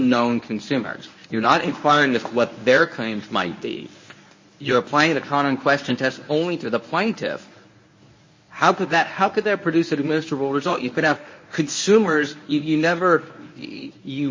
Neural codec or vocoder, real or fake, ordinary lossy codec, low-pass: codec, 24 kHz, 0.9 kbps, WavTokenizer, medium speech release version 1; fake; MP3, 32 kbps; 7.2 kHz